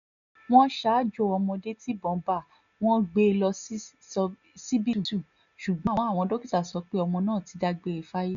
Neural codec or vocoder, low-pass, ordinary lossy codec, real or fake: none; 7.2 kHz; none; real